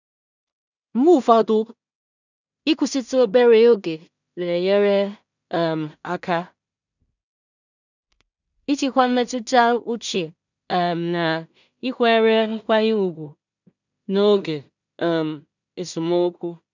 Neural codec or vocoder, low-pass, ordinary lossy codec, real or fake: codec, 16 kHz in and 24 kHz out, 0.4 kbps, LongCat-Audio-Codec, two codebook decoder; 7.2 kHz; none; fake